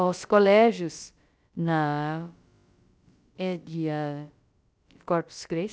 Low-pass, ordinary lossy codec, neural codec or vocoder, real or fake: none; none; codec, 16 kHz, about 1 kbps, DyCAST, with the encoder's durations; fake